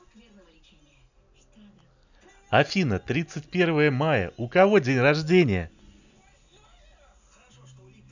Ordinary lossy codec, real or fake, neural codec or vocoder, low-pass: none; real; none; 7.2 kHz